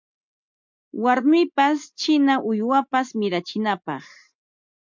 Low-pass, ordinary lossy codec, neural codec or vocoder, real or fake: 7.2 kHz; MP3, 64 kbps; none; real